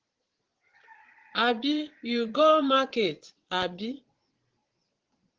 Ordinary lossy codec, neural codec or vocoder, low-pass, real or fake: Opus, 16 kbps; vocoder, 22.05 kHz, 80 mel bands, Vocos; 7.2 kHz; fake